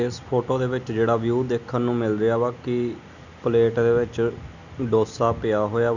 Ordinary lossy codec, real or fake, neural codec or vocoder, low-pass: none; real; none; 7.2 kHz